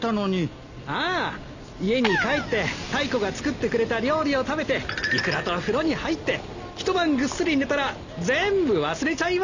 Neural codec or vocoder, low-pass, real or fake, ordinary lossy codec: none; 7.2 kHz; real; Opus, 64 kbps